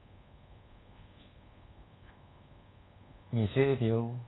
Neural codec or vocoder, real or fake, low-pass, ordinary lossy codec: codec, 24 kHz, 1.2 kbps, DualCodec; fake; 7.2 kHz; AAC, 16 kbps